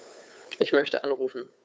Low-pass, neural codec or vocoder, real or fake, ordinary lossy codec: none; codec, 16 kHz, 2 kbps, FunCodec, trained on Chinese and English, 25 frames a second; fake; none